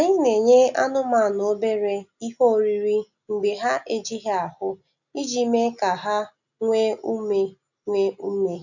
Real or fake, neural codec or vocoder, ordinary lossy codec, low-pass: real; none; none; 7.2 kHz